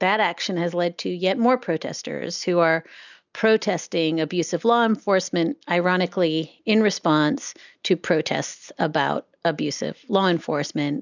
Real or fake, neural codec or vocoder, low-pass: real; none; 7.2 kHz